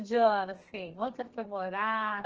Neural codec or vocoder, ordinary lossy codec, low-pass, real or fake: codec, 32 kHz, 1.9 kbps, SNAC; Opus, 16 kbps; 7.2 kHz; fake